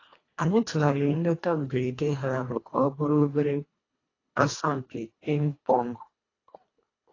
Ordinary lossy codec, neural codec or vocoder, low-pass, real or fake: AAC, 32 kbps; codec, 24 kHz, 1.5 kbps, HILCodec; 7.2 kHz; fake